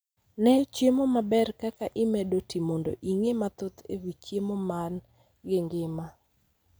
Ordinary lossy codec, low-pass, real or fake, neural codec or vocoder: none; none; real; none